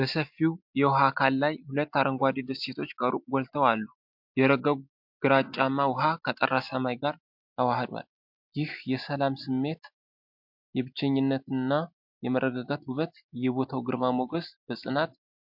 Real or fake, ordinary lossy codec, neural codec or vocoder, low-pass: real; MP3, 48 kbps; none; 5.4 kHz